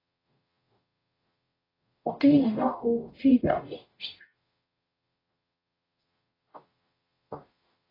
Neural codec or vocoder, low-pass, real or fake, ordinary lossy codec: codec, 44.1 kHz, 0.9 kbps, DAC; 5.4 kHz; fake; AAC, 24 kbps